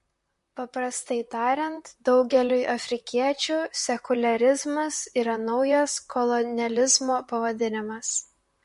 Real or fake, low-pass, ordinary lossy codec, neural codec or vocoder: fake; 14.4 kHz; MP3, 48 kbps; vocoder, 44.1 kHz, 128 mel bands, Pupu-Vocoder